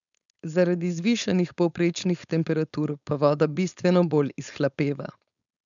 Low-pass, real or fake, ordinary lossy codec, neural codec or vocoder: 7.2 kHz; fake; none; codec, 16 kHz, 4.8 kbps, FACodec